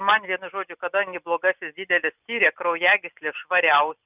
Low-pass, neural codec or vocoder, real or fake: 3.6 kHz; none; real